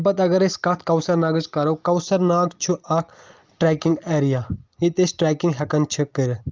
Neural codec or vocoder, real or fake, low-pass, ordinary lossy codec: none; real; 7.2 kHz; Opus, 32 kbps